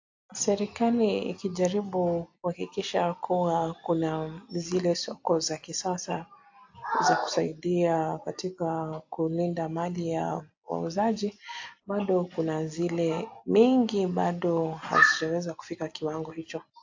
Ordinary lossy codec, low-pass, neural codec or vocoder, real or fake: AAC, 48 kbps; 7.2 kHz; none; real